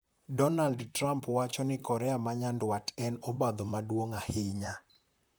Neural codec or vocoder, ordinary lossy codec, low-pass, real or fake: vocoder, 44.1 kHz, 128 mel bands, Pupu-Vocoder; none; none; fake